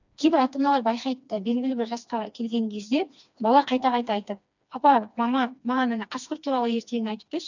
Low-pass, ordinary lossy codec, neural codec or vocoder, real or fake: 7.2 kHz; none; codec, 16 kHz, 2 kbps, FreqCodec, smaller model; fake